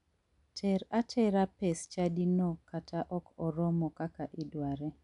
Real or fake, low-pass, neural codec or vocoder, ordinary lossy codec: real; 10.8 kHz; none; none